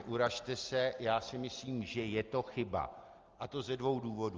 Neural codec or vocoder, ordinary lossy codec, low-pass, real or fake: none; Opus, 24 kbps; 7.2 kHz; real